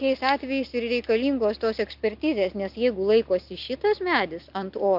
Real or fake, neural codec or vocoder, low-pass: real; none; 5.4 kHz